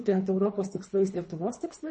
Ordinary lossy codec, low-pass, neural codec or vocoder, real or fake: MP3, 32 kbps; 10.8 kHz; codec, 24 kHz, 3 kbps, HILCodec; fake